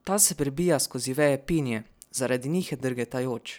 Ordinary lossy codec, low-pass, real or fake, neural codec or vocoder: none; none; real; none